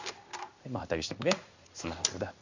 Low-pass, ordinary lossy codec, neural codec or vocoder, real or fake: 7.2 kHz; Opus, 64 kbps; codec, 16 kHz in and 24 kHz out, 1 kbps, XY-Tokenizer; fake